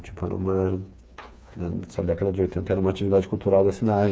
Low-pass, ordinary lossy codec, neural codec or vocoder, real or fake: none; none; codec, 16 kHz, 4 kbps, FreqCodec, smaller model; fake